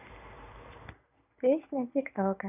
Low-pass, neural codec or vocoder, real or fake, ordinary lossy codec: 3.6 kHz; none; real; none